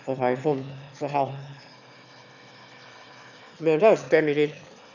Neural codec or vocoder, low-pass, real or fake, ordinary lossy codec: autoencoder, 22.05 kHz, a latent of 192 numbers a frame, VITS, trained on one speaker; 7.2 kHz; fake; none